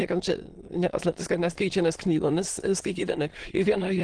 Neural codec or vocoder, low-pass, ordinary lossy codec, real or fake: autoencoder, 22.05 kHz, a latent of 192 numbers a frame, VITS, trained on many speakers; 9.9 kHz; Opus, 16 kbps; fake